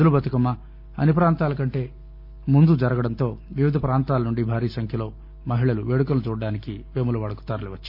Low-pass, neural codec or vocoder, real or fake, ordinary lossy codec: 5.4 kHz; none; real; none